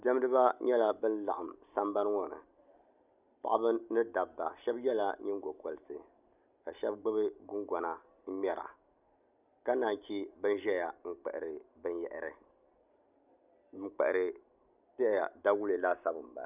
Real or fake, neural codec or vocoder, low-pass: real; none; 3.6 kHz